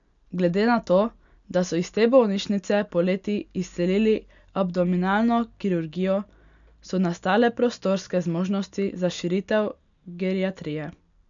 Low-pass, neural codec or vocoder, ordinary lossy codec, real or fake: 7.2 kHz; none; none; real